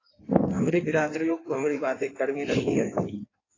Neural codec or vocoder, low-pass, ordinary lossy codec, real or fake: codec, 16 kHz in and 24 kHz out, 1.1 kbps, FireRedTTS-2 codec; 7.2 kHz; AAC, 32 kbps; fake